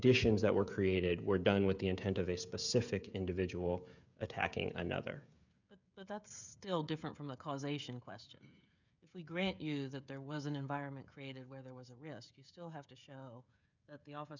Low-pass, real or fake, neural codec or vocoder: 7.2 kHz; fake; codec, 16 kHz, 16 kbps, FreqCodec, smaller model